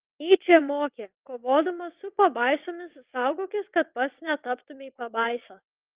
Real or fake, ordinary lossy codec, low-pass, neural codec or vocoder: fake; Opus, 24 kbps; 3.6 kHz; vocoder, 22.05 kHz, 80 mel bands, WaveNeXt